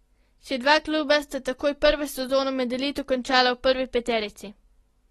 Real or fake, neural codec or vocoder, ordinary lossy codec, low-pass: real; none; AAC, 32 kbps; 19.8 kHz